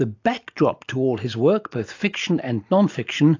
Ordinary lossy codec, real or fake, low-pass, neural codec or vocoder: AAC, 48 kbps; real; 7.2 kHz; none